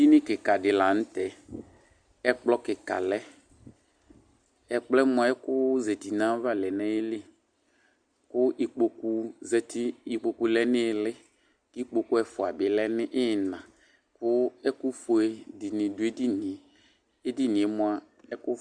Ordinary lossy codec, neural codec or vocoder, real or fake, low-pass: Opus, 64 kbps; none; real; 9.9 kHz